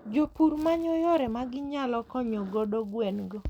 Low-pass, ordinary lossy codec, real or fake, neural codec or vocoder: 19.8 kHz; none; real; none